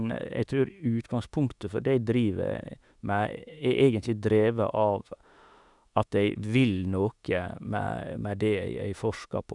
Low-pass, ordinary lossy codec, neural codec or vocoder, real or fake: 10.8 kHz; none; autoencoder, 48 kHz, 32 numbers a frame, DAC-VAE, trained on Japanese speech; fake